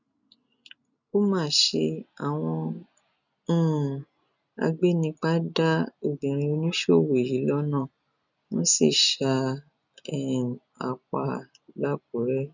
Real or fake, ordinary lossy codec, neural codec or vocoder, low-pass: real; none; none; 7.2 kHz